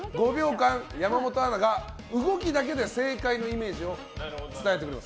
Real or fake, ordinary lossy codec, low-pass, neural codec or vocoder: real; none; none; none